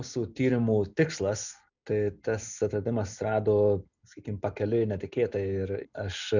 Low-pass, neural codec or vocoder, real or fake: 7.2 kHz; none; real